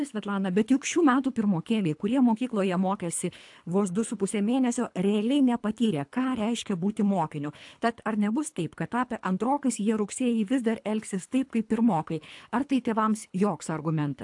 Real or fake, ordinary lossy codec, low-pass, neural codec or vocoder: fake; AAC, 64 kbps; 10.8 kHz; codec, 24 kHz, 3 kbps, HILCodec